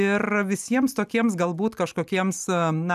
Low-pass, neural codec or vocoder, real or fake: 14.4 kHz; none; real